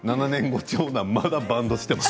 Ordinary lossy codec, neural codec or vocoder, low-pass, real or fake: none; none; none; real